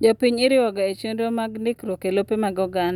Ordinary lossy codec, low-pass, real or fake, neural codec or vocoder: none; 19.8 kHz; real; none